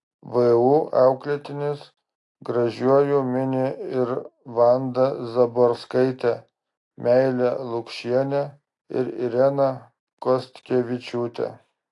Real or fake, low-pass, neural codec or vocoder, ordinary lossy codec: real; 10.8 kHz; none; AAC, 48 kbps